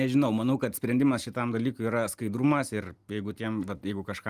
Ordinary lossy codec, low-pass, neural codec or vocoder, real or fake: Opus, 32 kbps; 14.4 kHz; none; real